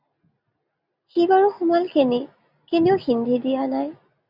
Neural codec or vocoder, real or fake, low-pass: none; real; 5.4 kHz